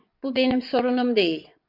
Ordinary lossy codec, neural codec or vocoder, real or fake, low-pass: MP3, 48 kbps; vocoder, 22.05 kHz, 80 mel bands, WaveNeXt; fake; 5.4 kHz